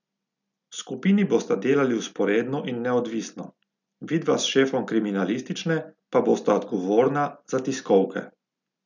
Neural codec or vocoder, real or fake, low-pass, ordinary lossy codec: none; real; 7.2 kHz; none